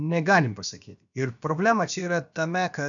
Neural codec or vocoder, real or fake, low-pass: codec, 16 kHz, about 1 kbps, DyCAST, with the encoder's durations; fake; 7.2 kHz